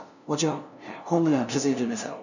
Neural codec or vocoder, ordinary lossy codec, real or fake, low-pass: codec, 16 kHz, 0.5 kbps, FunCodec, trained on LibriTTS, 25 frames a second; none; fake; 7.2 kHz